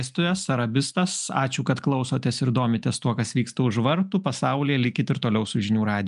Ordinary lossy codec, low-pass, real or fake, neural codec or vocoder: MP3, 96 kbps; 10.8 kHz; real; none